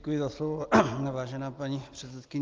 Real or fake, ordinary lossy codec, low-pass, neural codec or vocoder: real; Opus, 24 kbps; 7.2 kHz; none